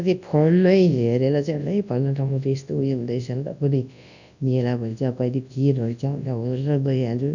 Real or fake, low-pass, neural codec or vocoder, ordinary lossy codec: fake; 7.2 kHz; codec, 24 kHz, 0.9 kbps, WavTokenizer, large speech release; none